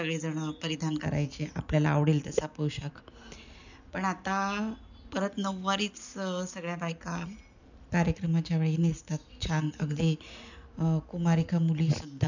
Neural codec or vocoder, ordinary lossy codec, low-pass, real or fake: none; none; 7.2 kHz; real